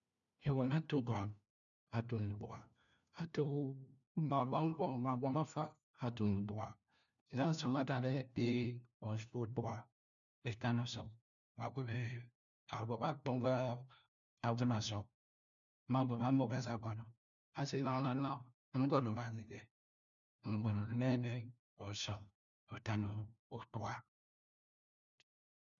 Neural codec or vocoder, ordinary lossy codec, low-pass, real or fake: codec, 16 kHz, 1 kbps, FunCodec, trained on LibriTTS, 50 frames a second; none; 7.2 kHz; fake